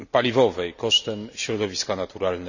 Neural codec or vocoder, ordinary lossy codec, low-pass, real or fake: none; none; 7.2 kHz; real